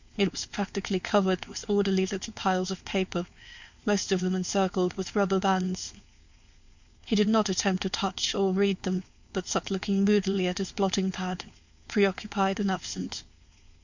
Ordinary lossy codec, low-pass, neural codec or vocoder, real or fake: Opus, 64 kbps; 7.2 kHz; codec, 16 kHz, 4.8 kbps, FACodec; fake